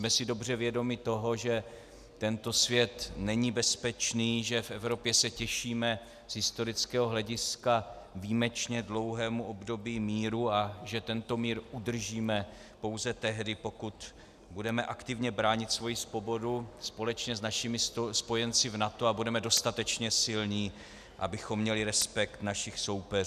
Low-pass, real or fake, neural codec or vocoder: 14.4 kHz; real; none